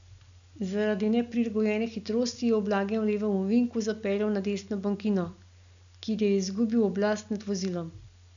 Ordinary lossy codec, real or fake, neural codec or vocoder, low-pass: none; real; none; 7.2 kHz